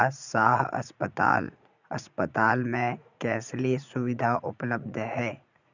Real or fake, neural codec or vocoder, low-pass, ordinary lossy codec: fake; vocoder, 44.1 kHz, 80 mel bands, Vocos; 7.2 kHz; none